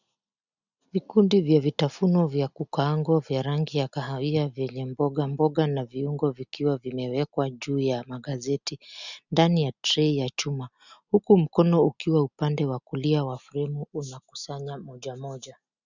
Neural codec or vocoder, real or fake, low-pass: none; real; 7.2 kHz